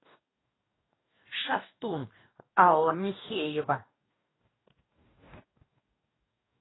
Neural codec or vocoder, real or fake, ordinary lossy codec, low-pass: codec, 44.1 kHz, 2.6 kbps, DAC; fake; AAC, 16 kbps; 7.2 kHz